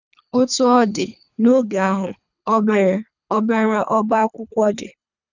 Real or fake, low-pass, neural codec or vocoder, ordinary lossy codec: fake; 7.2 kHz; codec, 24 kHz, 3 kbps, HILCodec; none